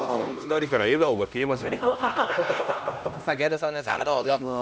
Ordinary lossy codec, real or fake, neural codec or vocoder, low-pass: none; fake; codec, 16 kHz, 1 kbps, X-Codec, HuBERT features, trained on LibriSpeech; none